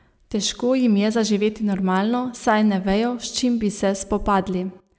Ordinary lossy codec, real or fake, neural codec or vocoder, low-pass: none; real; none; none